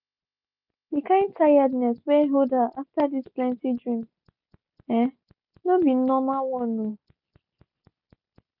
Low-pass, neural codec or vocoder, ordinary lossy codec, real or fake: 5.4 kHz; none; none; real